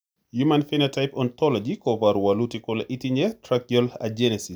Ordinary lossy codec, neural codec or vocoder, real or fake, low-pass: none; none; real; none